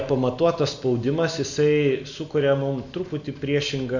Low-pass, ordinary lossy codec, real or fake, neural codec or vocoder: 7.2 kHz; Opus, 64 kbps; real; none